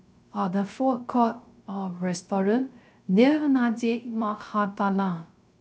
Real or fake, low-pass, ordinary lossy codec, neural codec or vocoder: fake; none; none; codec, 16 kHz, 0.3 kbps, FocalCodec